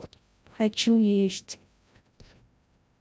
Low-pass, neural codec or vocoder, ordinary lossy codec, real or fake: none; codec, 16 kHz, 0.5 kbps, FreqCodec, larger model; none; fake